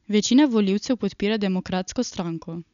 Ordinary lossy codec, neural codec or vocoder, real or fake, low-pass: none; none; real; 7.2 kHz